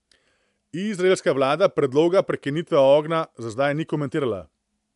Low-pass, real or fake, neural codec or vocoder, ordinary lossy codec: 10.8 kHz; real; none; AAC, 96 kbps